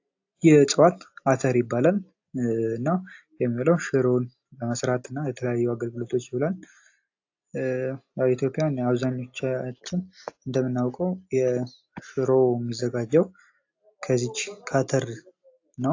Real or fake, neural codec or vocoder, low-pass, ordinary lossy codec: real; none; 7.2 kHz; AAC, 48 kbps